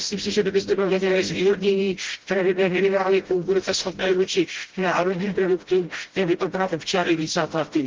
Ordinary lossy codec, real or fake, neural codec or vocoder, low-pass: Opus, 16 kbps; fake; codec, 16 kHz, 0.5 kbps, FreqCodec, smaller model; 7.2 kHz